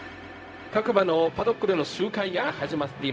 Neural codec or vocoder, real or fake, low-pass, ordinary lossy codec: codec, 16 kHz, 0.4 kbps, LongCat-Audio-Codec; fake; none; none